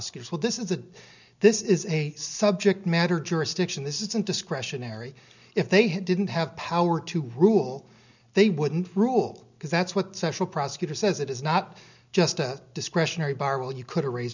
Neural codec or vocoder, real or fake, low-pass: none; real; 7.2 kHz